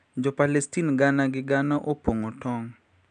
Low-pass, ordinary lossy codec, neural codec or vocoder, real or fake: 9.9 kHz; none; none; real